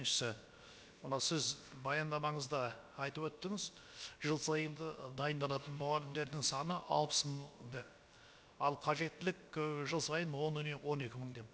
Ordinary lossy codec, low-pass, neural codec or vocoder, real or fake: none; none; codec, 16 kHz, about 1 kbps, DyCAST, with the encoder's durations; fake